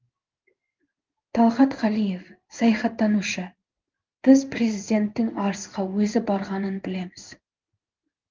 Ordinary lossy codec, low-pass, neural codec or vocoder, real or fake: Opus, 32 kbps; 7.2 kHz; codec, 16 kHz in and 24 kHz out, 1 kbps, XY-Tokenizer; fake